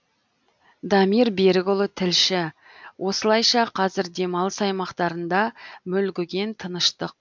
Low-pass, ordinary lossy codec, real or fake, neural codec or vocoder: 7.2 kHz; MP3, 64 kbps; real; none